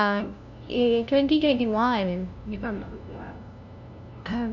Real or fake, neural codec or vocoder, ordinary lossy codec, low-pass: fake; codec, 16 kHz, 0.5 kbps, FunCodec, trained on LibriTTS, 25 frames a second; none; 7.2 kHz